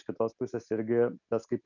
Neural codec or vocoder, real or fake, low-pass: none; real; 7.2 kHz